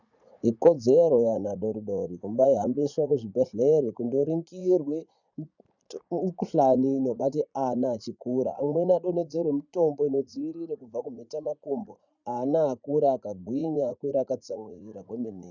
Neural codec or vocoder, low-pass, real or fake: vocoder, 44.1 kHz, 80 mel bands, Vocos; 7.2 kHz; fake